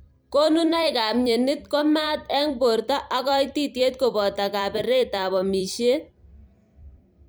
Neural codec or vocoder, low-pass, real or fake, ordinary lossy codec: vocoder, 44.1 kHz, 128 mel bands every 256 samples, BigVGAN v2; none; fake; none